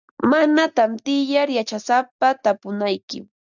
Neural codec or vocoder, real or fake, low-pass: none; real; 7.2 kHz